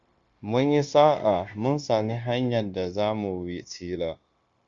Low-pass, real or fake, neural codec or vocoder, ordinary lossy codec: 7.2 kHz; fake; codec, 16 kHz, 0.9 kbps, LongCat-Audio-Codec; Opus, 64 kbps